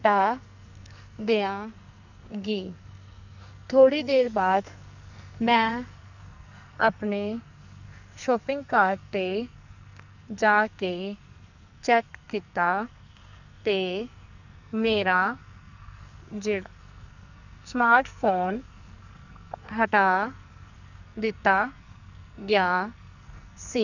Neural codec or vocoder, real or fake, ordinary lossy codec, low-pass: codec, 44.1 kHz, 2.6 kbps, SNAC; fake; none; 7.2 kHz